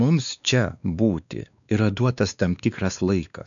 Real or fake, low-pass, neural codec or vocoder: fake; 7.2 kHz; codec, 16 kHz, 2 kbps, X-Codec, WavLM features, trained on Multilingual LibriSpeech